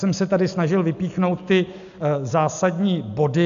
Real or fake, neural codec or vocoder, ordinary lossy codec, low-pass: real; none; MP3, 96 kbps; 7.2 kHz